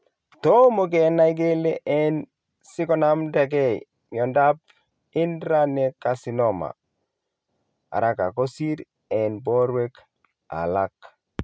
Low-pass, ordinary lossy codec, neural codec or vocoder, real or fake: none; none; none; real